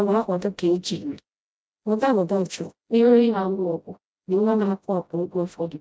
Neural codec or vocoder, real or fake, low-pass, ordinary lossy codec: codec, 16 kHz, 0.5 kbps, FreqCodec, smaller model; fake; none; none